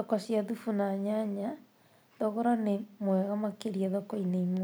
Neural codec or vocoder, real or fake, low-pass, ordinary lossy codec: vocoder, 44.1 kHz, 128 mel bands every 256 samples, BigVGAN v2; fake; none; none